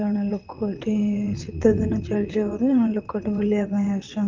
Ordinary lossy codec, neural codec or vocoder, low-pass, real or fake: Opus, 32 kbps; vocoder, 22.05 kHz, 80 mel bands, WaveNeXt; 7.2 kHz; fake